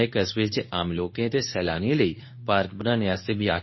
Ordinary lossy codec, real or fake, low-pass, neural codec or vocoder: MP3, 24 kbps; fake; 7.2 kHz; codec, 24 kHz, 0.9 kbps, WavTokenizer, medium speech release version 2